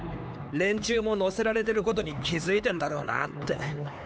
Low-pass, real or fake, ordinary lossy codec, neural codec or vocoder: none; fake; none; codec, 16 kHz, 4 kbps, X-Codec, HuBERT features, trained on LibriSpeech